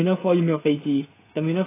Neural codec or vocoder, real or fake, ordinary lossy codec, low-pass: codec, 16 kHz, 16 kbps, FreqCodec, smaller model; fake; AAC, 16 kbps; 3.6 kHz